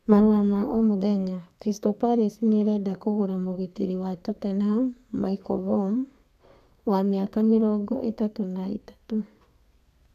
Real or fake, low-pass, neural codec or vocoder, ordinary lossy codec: fake; 14.4 kHz; codec, 32 kHz, 1.9 kbps, SNAC; none